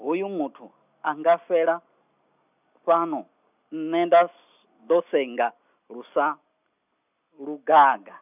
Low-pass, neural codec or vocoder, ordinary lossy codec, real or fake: 3.6 kHz; none; none; real